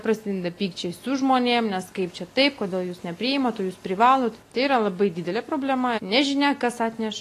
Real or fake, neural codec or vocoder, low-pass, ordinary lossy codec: real; none; 14.4 kHz; AAC, 48 kbps